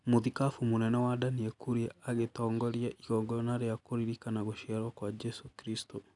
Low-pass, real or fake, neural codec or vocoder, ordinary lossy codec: 10.8 kHz; real; none; none